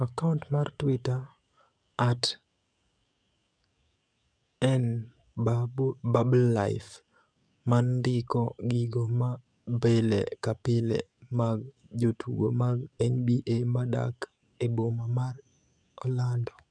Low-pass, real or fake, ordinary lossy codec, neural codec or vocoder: 9.9 kHz; fake; none; codec, 44.1 kHz, 7.8 kbps, DAC